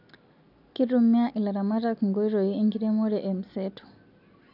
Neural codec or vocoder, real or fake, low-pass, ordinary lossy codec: none; real; 5.4 kHz; none